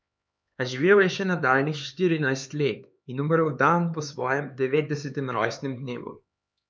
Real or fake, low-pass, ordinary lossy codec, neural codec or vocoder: fake; none; none; codec, 16 kHz, 4 kbps, X-Codec, HuBERT features, trained on LibriSpeech